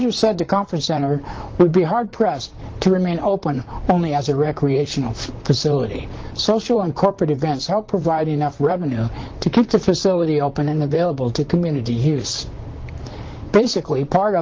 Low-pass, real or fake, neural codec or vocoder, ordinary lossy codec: 7.2 kHz; fake; vocoder, 44.1 kHz, 128 mel bands, Pupu-Vocoder; Opus, 16 kbps